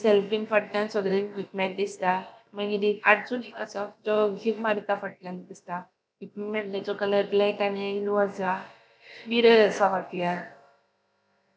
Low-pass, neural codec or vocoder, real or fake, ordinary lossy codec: none; codec, 16 kHz, about 1 kbps, DyCAST, with the encoder's durations; fake; none